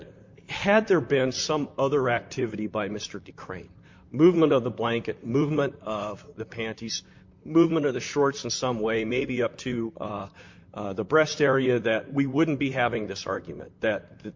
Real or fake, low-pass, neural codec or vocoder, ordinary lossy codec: fake; 7.2 kHz; vocoder, 44.1 kHz, 128 mel bands, Pupu-Vocoder; MP3, 48 kbps